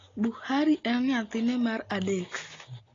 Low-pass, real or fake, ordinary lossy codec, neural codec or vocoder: 7.2 kHz; real; AAC, 32 kbps; none